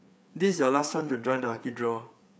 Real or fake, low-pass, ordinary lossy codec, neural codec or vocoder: fake; none; none; codec, 16 kHz, 4 kbps, FreqCodec, larger model